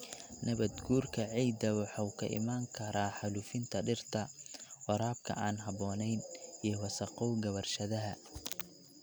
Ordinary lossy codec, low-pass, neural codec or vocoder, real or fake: none; none; none; real